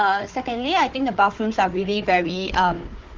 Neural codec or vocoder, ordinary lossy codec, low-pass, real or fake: codec, 16 kHz, 4 kbps, FunCodec, trained on Chinese and English, 50 frames a second; Opus, 16 kbps; 7.2 kHz; fake